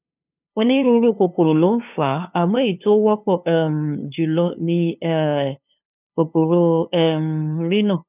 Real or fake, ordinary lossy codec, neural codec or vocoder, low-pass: fake; none; codec, 16 kHz, 2 kbps, FunCodec, trained on LibriTTS, 25 frames a second; 3.6 kHz